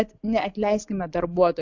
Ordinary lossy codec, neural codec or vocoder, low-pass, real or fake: AAC, 48 kbps; none; 7.2 kHz; real